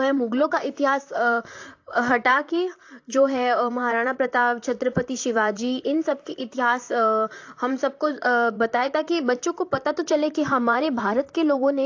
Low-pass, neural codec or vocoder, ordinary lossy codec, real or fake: 7.2 kHz; vocoder, 44.1 kHz, 128 mel bands, Pupu-Vocoder; AAC, 48 kbps; fake